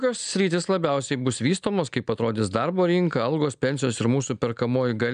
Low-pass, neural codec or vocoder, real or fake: 9.9 kHz; none; real